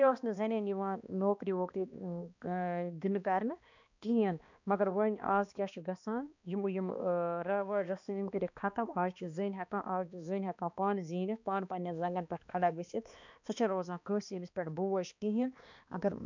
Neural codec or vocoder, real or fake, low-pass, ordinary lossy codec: codec, 16 kHz, 2 kbps, X-Codec, HuBERT features, trained on balanced general audio; fake; 7.2 kHz; none